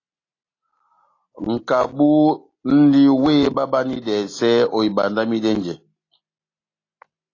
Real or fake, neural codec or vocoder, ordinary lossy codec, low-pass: real; none; AAC, 32 kbps; 7.2 kHz